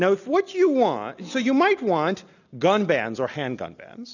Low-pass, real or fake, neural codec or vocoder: 7.2 kHz; real; none